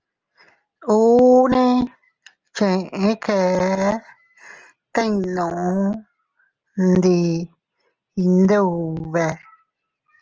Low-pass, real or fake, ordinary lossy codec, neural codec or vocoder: 7.2 kHz; real; Opus, 24 kbps; none